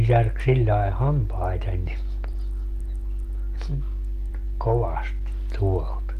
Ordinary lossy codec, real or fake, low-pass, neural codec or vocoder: Opus, 32 kbps; real; 14.4 kHz; none